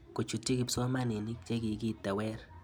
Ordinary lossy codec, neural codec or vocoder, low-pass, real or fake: none; none; none; real